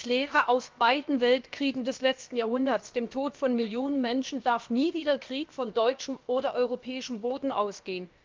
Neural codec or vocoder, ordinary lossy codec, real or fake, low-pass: codec, 16 kHz, about 1 kbps, DyCAST, with the encoder's durations; Opus, 24 kbps; fake; 7.2 kHz